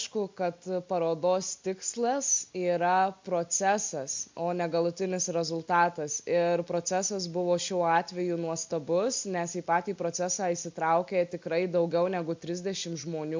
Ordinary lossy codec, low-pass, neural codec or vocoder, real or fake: MP3, 64 kbps; 7.2 kHz; none; real